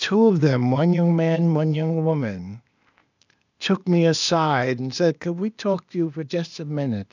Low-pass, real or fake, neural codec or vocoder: 7.2 kHz; fake; codec, 16 kHz, 0.8 kbps, ZipCodec